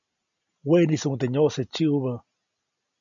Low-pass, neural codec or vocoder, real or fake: 7.2 kHz; none; real